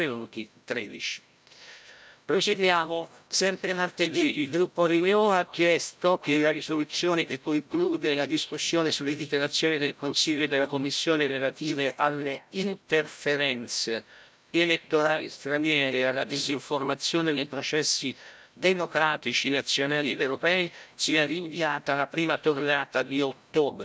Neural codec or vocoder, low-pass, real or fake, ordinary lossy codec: codec, 16 kHz, 0.5 kbps, FreqCodec, larger model; none; fake; none